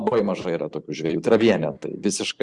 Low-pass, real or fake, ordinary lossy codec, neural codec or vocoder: 10.8 kHz; fake; Opus, 64 kbps; vocoder, 44.1 kHz, 128 mel bands every 512 samples, BigVGAN v2